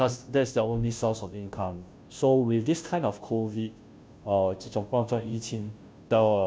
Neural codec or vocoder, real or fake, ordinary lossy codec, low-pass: codec, 16 kHz, 0.5 kbps, FunCodec, trained on Chinese and English, 25 frames a second; fake; none; none